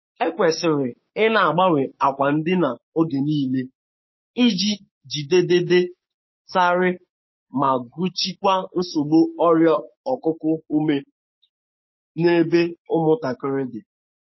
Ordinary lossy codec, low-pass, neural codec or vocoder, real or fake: MP3, 24 kbps; 7.2 kHz; codec, 16 kHz, 6 kbps, DAC; fake